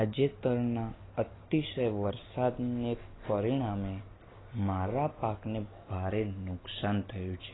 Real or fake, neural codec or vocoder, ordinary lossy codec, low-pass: real; none; AAC, 16 kbps; 7.2 kHz